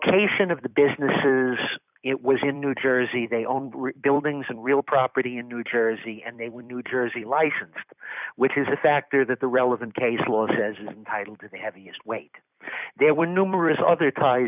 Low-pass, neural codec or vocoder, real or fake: 3.6 kHz; vocoder, 44.1 kHz, 128 mel bands every 256 samples, BigVGAN v2; fake